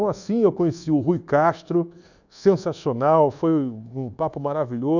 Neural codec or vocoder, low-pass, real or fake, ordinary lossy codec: codec, 24 kHz, 1.2 kbps, DualCodec; 7.2 kHz; fake; none